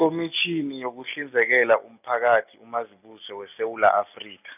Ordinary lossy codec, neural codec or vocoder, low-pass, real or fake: none; none; 3.6 kHz; real